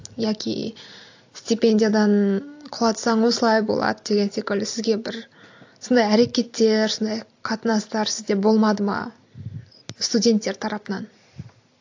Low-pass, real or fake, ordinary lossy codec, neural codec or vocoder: 7.2 kHz; real; AAC, 48 kbps; none